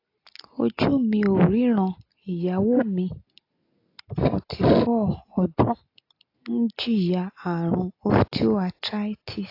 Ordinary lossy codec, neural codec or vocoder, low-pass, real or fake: AAC, 32 kbps; none; 5.4 kHz; real